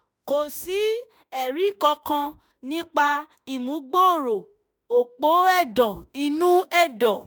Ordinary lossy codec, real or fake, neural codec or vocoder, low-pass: none; fake; autoencoder, 48 kHz, 32 numbers a frame, DAC-VAE, trained on Japanese speech; none